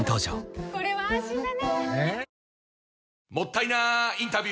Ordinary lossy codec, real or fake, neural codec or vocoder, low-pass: none; real; none; none